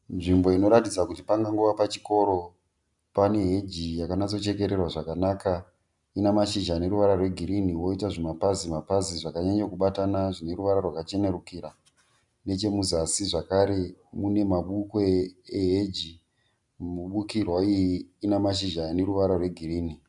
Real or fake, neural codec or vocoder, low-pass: real; none; 10.8 kHz